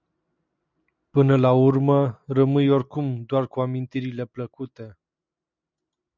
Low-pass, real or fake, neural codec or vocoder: 7.2 kHz; real; none